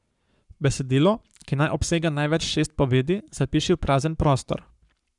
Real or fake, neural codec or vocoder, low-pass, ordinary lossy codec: fake; codec, 44.1 kHz, 7.8 kbps, Pupu-Codec; 10.8 kHz; none